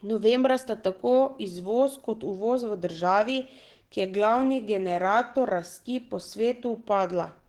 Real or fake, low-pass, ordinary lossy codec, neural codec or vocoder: fake; 19.8 kHz; Opus, 16 kbps; codec, 44.1 kHz, 7.8 kbps, DAC